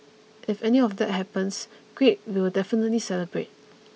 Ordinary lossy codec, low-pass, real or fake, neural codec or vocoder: none; none; real; none